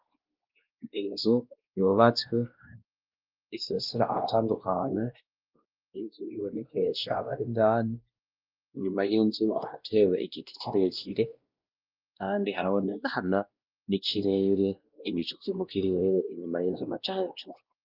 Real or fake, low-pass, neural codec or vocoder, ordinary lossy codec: fake; 5.4 kHz; codec, 16 kHz, 1 kbps, X-Codec, WavLM features, trained on Multilingual LibriSpeech; Opus, 24 kbps